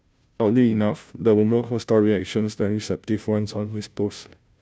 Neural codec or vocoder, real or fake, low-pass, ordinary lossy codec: codec, 16 kHz, 0.5 kbps, FunCodec, trained on Chinese and English, 25 frames a second; fake; none; none